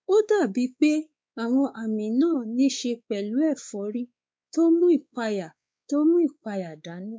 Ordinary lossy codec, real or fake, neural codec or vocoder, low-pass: none; fake; codec, 16 kHz, 4 kbps, X-Codec, WavLM features, trained on Multilingual LibriSpeech; none